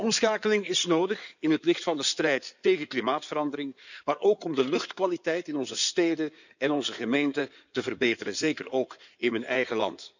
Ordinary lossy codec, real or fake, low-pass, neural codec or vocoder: none; fake; 7.2 kHz; codec, 16 kHz in and 24 kHz out, 2.2 kbps, FireRedTTS-2 codec